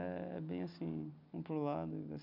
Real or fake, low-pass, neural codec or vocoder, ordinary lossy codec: real; 5.4 kHz; none; none